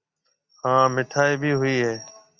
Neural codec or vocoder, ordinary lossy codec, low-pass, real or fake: none; Opus, 64 kbps; 7.2 kHz; real